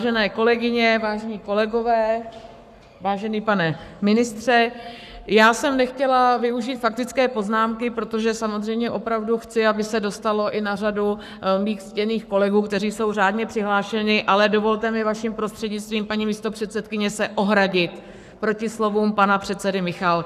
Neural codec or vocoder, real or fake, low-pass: codec, 44.1 kHz, 7.8 kbps, Pupu-Codec; fake; 14.4 kHz